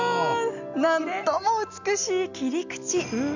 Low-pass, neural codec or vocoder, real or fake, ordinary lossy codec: 7.2 kHz; none; real; none